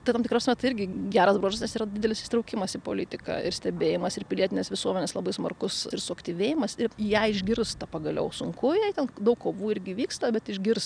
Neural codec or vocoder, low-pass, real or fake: none; 9.9 kHz; real